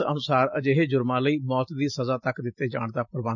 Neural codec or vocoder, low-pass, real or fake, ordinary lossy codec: none; 7.2 kHz; real; none